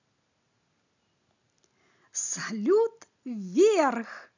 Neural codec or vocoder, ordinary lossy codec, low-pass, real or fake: none; none; 7.2 kHz; real